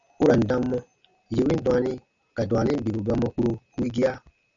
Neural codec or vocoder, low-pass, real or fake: none; 7.2 kHz; real